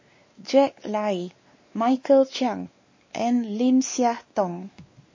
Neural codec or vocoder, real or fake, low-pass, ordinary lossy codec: codec, 16 kHz, 2 kbps, X-Codec, WavLM features, trained on Multilingual LibriSpeech; fake; 7.2 kHz; MP3, 32 kbps